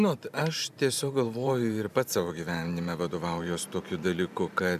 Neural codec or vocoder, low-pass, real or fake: vocoder, 44.1 kHz, 128 mel bands every 512 samples, BigVGAN v2; 14.4 kHz; fake